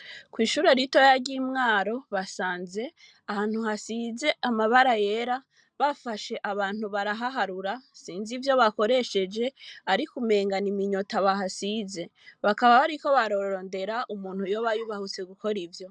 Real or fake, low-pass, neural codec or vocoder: real; 9.9 kHz; none